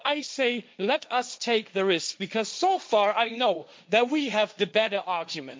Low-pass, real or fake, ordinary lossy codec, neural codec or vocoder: none; fake; none; codec, 16 kHz, 1.1 kbps, Voila-Tokenizer